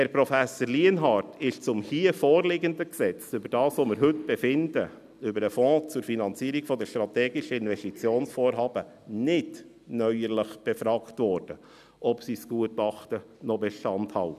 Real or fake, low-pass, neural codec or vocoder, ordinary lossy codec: real; 14.4 kHz; none; none